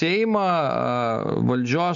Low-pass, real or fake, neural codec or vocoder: 7.2 kHz; real; none